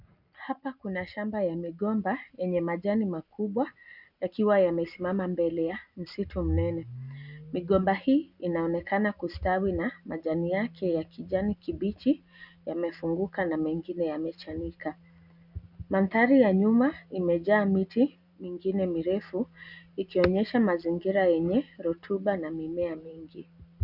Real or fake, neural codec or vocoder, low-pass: real; none; 5.4 kHz